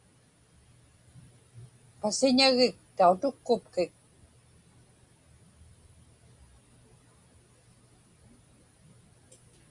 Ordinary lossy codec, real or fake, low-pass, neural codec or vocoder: Opus, 64 kbps; real; 10.8 kHz; none